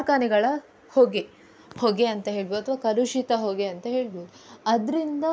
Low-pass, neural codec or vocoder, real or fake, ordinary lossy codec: none; none; real; none